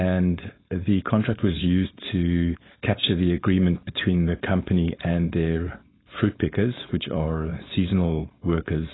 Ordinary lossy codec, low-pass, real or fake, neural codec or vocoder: AAC, 16 kbps; 7.2 kHz; fake; codec, 16 kHz, 4.8 kbps, FACodec